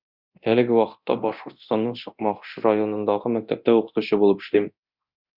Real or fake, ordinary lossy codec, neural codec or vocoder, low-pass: fake; Opus, 64 kbps; codec, 24 kHz, 0.9 kbps, DualCodec; 5.4 kHz